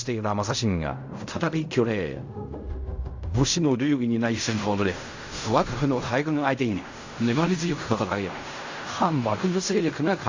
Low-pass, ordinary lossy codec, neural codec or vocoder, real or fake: 7.2 kHz; AAC, 48 kbps; codec, 16 kHz in and 24 kHz out, 0.4 kbps, LongCat-Audio-Codec, fine tuned four codebook decoder; fake